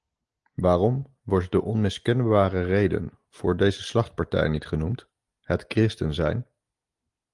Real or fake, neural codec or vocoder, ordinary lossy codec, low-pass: real; none; Opus, 24 kbps; 10.8 kHz